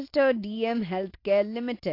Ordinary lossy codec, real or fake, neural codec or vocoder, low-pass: AAC, 32 kbps; real; none; 5.4 kHz